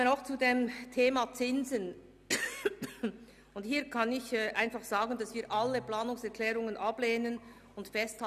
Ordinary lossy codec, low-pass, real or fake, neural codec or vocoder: none; 14.4 kHz; real; none